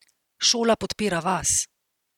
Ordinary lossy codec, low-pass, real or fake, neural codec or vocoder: none; 19.8 kHz; real; none